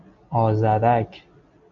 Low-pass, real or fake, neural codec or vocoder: 7.2 kHz; real; none